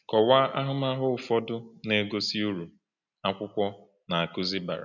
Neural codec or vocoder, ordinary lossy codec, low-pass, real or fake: none; none; 7.2 kHz; real